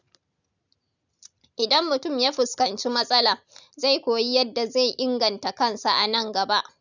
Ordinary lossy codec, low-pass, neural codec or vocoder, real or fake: none; 7.2 kHz; none; real